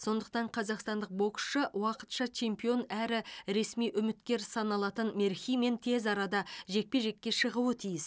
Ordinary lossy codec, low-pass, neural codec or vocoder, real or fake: none; none; none; real